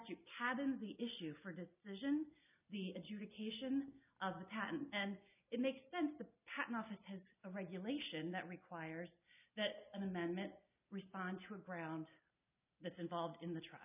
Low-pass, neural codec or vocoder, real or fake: 3.6 kHz; none; real